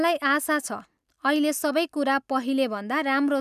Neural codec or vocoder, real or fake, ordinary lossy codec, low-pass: none; real; none; 14.4 kHz